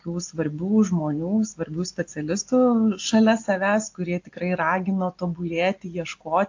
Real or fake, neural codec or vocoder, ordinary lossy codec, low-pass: real; none; AAC, 48 kbps; 7.2 kHz